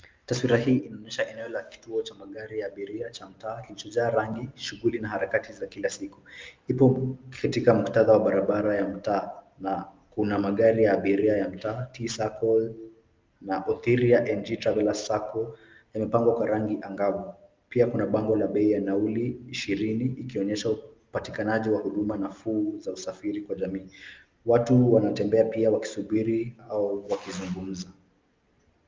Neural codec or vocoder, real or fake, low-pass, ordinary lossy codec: none; real; 7.2 kHz; Opus, 32 kbps